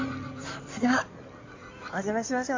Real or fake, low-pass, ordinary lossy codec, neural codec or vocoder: fake; 7.2 kHz; AAC, 48 kbps; codec, 16 kHz in and 24 kHz out, 2.2 kbps, FireRedTTS-2 codec